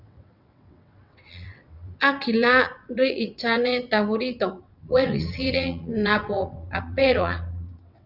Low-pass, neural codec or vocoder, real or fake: 5.4 kHz; vocoder, 22.05 kHz, 80 mel bands, WaveNeXt; fake